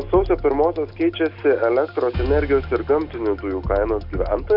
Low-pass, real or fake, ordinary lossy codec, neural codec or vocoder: 5.4 kHz; real; Opus, 64 kbps; none